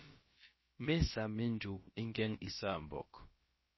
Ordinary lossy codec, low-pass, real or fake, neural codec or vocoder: MP3, 24 kbps; 7.2 kHz; fake; codec, 16 kHz, about 1 kbps, DyCAST, with the encoder's durations